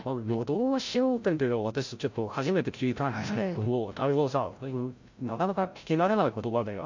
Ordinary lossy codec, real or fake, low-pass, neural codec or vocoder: MP3, 48 kbps; fake; 7.2 kHz; codec, 16 kHz, 0.5 kbps, FreqCodec, larger model